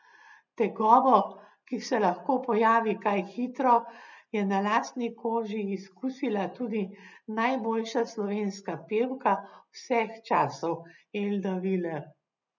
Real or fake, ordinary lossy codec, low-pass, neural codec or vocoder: real; none; 7.2 kHz; none